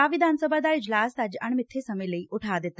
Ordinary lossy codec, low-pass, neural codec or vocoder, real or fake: none; none; none; real